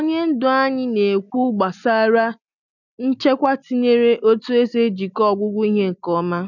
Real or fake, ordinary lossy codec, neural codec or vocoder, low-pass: real; none; none; 7.2 kHz